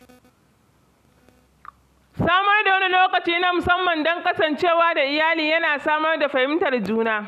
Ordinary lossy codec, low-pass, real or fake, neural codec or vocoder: none; 14.4 kHz; real; none